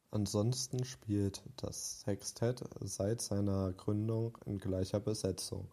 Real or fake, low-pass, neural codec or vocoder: real; 14.4 kHz; none